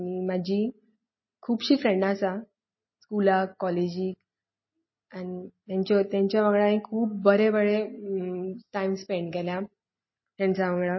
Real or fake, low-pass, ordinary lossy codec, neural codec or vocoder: real; 7.2 kHz; MP3, 24 kbps; none